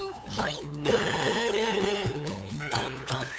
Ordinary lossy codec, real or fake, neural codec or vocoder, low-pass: none; fake; codec, 16 kHz, 8 kbps, FunCodec, trained on LibriTTS, 25 frames a second; none